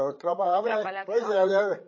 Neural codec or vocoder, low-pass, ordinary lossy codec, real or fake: codec, 16 kHz, 8 kbps, FreqCodec, larger model; 7.2 kHz; MP3, 32 kbps; fake